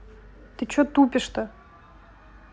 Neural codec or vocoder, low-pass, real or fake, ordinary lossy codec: none; none; real; none